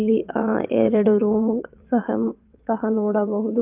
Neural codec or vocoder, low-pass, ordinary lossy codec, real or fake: vocoder, 22.05 kHz, 80 mel bands, WaveNeXt; 3.6 kHz; Opus, 24 kbps; fake